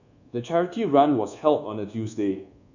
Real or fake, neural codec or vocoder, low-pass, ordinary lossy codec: fake; codec, 24 kHz, 1.2 kbps, DualCodec; 7.2 kHz; none